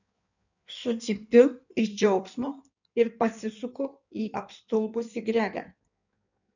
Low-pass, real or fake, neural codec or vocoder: 7.2 kHz; fake; codec, 16 kHz in and 24 kHz out, 1.1 kbps, FireRedTTS-2 codec